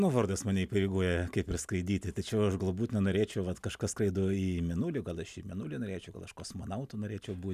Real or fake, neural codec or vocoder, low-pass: real; none; 14.4 kHz